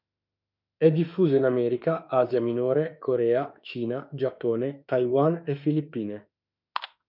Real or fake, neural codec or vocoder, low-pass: fake; autoencoder, 48 kHz, 32 numbers a frame, DAC-VAE, trained on Japanese speech; 5.4 kHz